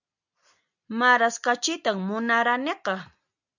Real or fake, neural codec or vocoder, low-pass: real; none; 7.2 kHz